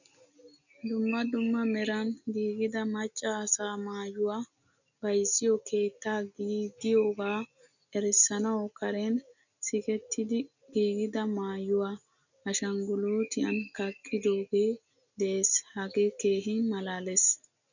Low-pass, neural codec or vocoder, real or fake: 7.2 kHz; none; real